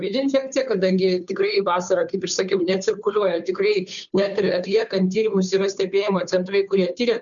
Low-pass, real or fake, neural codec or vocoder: 7.2 kHz; fake; codec, 16 kHz, 2 kbps, FunCodec, trained on Chinese and English, 25 frames a second